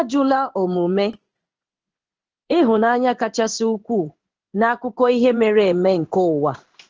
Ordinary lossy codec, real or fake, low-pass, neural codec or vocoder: Opus, 16 kbps; fake; 7.2 kHz; codec, 16 kHz in and 24 kHz out, 1 kbps, XY-Tokenizer